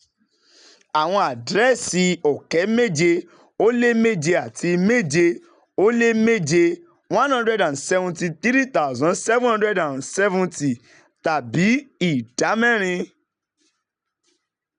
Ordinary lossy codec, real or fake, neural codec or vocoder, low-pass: none; real; none; 9.9 kHz